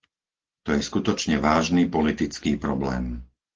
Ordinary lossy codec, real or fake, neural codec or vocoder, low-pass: Opus, 16 kbps; real; none; 7.2 kHz